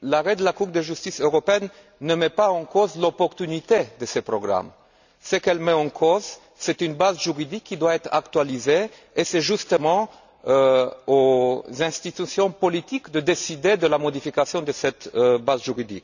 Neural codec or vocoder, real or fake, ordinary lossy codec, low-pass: none; real; none; 7.2 kHz